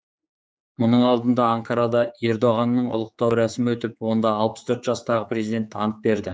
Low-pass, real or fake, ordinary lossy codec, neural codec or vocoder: none; fake; none; codec, 16 kHz, 4 kbps, X-Codec, HuBERT features, trained on general audio